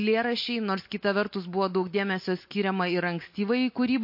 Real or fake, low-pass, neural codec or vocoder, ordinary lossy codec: real; 5.4 kHz; none; MP3, 32 kbps